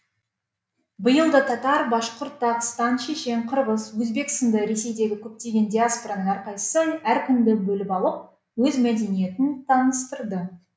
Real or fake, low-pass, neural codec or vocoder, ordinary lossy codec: real; none; none; none